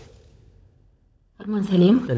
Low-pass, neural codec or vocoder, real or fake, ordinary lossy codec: none; codec, 16 kHz, 16 kbps, FunCodec, trained on LibriTTS, 50 frames a second; fake; none